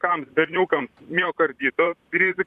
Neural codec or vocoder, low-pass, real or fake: vocoder, 44.1 kHz, 128 mel bands, Pupu-Vocoder; 14.4 kHz; fake